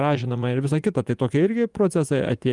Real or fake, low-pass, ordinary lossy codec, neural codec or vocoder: fake; 10.8 kHz; Opus, 32 kbps; vocoder, 24 kHz, 100 mel bands, Vocos